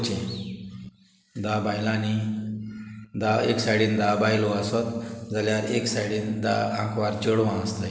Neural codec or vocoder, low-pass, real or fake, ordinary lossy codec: none; none; real; none